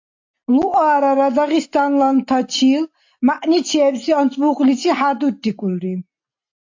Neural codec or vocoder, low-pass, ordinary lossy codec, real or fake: none; 7.2 kHz; AAC, 48 kbps; real